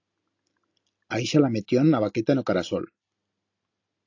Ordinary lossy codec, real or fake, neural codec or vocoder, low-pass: AAC, 48 kbps; real; none; 7.2 kHz